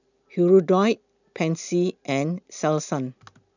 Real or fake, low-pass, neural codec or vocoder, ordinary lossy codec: real; 7.2 kHz; none; none